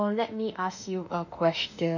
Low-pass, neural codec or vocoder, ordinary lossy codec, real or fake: 7.2 kHz; autoencoder, 48 kHz, 32 numbers a frame, DAC-VAE, trained on Japanese speech; none; fake